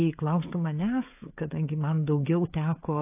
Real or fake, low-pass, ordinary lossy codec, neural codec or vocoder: fake; 3.6 kHz; AAC, 24 kbps; codec, 16 kHz, 16 kbps, FreqCodec, smaller model